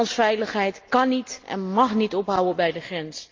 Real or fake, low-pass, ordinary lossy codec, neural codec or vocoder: real; 7.2 kHz; Opus, 32 kbps; none